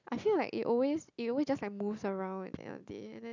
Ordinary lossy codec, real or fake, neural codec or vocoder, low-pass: none; real; none; 7.2 kHz